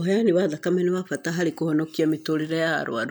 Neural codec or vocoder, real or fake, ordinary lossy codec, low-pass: none; real; none; none